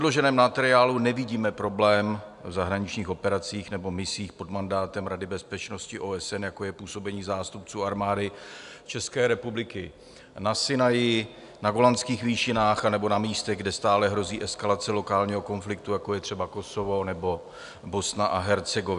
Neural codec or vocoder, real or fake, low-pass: none; real; 10.8 kHz